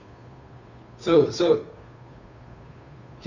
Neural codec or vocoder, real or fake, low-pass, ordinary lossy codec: codec, 16 kHz, 2 kbps, FunCodec, trained on Chinese and English, 25 frames a second; fake; 7.2 kHz; none